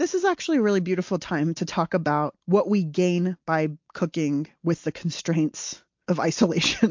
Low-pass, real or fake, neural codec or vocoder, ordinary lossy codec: 7.2 kHz; real; none; MP3, 48 kbps